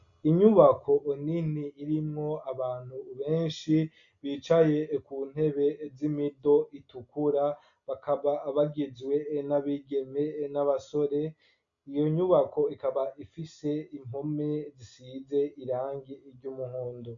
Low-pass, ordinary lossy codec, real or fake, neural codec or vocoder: 7.2 kHz; MP3, 96 kbps; real; none